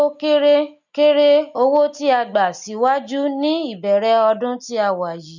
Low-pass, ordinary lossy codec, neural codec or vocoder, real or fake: 7.2 kHz; none; none; real